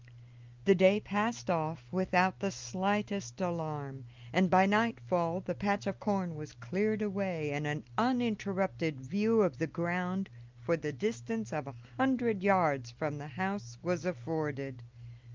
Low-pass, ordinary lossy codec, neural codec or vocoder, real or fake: 7.2 kHz; Opus, 24 kbps; none; real